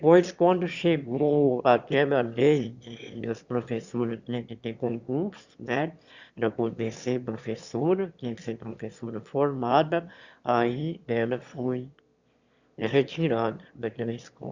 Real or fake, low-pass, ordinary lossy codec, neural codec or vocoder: fake; 7.2 kHz; Opus, 64 kbps; autoencoder, 22.05 kHz, a latent of 192 numbers a frame, VITS, trained on one speaker